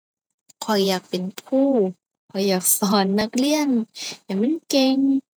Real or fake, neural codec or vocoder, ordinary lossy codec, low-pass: fake; vocoder, 48 kHz, 128 mel bands, Vocos; none; none